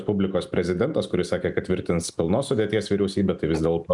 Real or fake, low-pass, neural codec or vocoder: real; 10.8 kHz; none